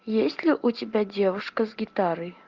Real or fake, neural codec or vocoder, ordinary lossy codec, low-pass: real; none; Opus, 24 kbps; 7.2 kHz